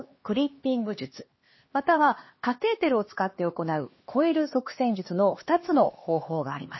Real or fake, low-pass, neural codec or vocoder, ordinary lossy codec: fake; 7.2 kHz; codec, 16 kHz, 2 kbps, X-Codec, HuBERT features, trained on LibriSpeech; MP3, 24 kbps